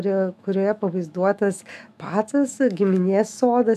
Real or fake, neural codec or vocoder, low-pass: fake; autoencoder, 48 kHz, 128 numbers a frame, DAC-VAE, trained on Japanese speech; 14.4 kHz